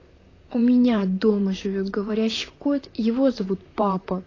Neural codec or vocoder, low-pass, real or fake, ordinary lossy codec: vocoder, 44.1 kHz, 128 mel bands, Pupu-Vocoder; 7.2 kHz; fake; AAC, 32 kbps